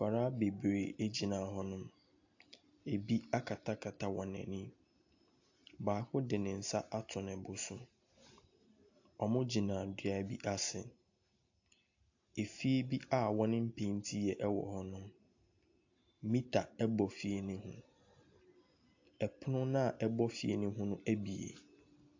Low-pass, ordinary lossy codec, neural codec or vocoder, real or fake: 7.2 kHz; AAC, 48 kbps; none; real